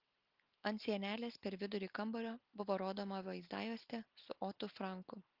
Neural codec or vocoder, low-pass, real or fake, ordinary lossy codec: none; 5.4 kHz; real; Opus, 32 kbps